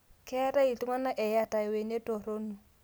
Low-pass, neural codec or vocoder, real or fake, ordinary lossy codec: none; none; real; none